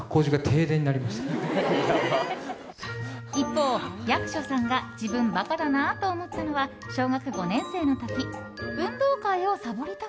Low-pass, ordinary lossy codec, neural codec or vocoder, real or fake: none; none; none; real